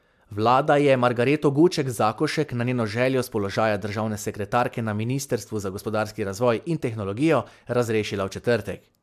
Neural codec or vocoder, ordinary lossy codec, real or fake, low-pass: none; MP3, 96 kbps; real; 14.4 kHz